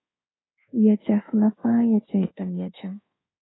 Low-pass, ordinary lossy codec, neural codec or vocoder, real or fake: 7.2 kHz; AAC, 16 kbps; codec, 24 kHz, 1.2 kbps, DualCodec; fake